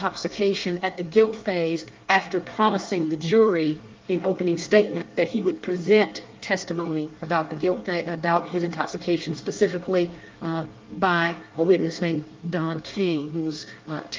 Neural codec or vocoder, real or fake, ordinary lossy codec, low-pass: codec, 24 kHz, 1 kbps, SNAC; fake; Opus, 32 kbps; 7.2 kHz